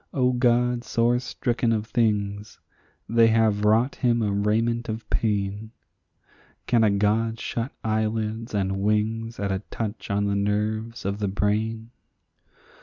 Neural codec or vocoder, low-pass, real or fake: none; 7.2 kHz; real